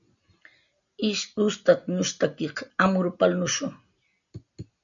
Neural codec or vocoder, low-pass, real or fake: none; 7.2 kHz; real